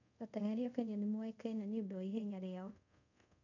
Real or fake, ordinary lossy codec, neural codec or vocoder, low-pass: fake; none; codec, 24 kHz, 0.5 kbps, DualCodec; 7.2 kHz